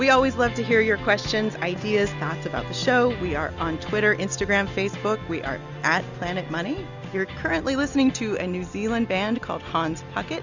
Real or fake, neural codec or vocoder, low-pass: real; none; 7.2 kHz